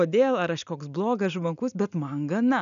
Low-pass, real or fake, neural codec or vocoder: 7.2 kHz; real; none